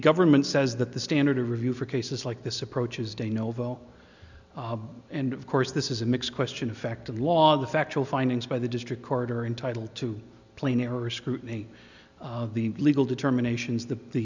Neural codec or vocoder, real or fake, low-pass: none; real; 7.2 kHz